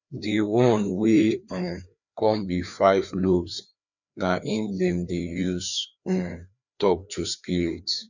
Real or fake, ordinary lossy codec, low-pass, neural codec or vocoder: fake; none; 7.2 kHz; codec, 16 kHz, 2 kbps, FreqCodec, larger model